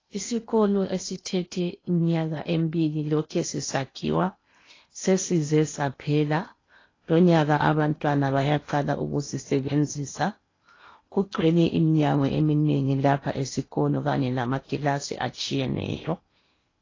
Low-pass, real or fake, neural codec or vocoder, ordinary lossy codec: 7.2 kHz; fake; codec, 16 kHz in and 24 kHz out, 0.8 kbps, FocalCodec, streaming, 65536 codes; AAC, 32 kbps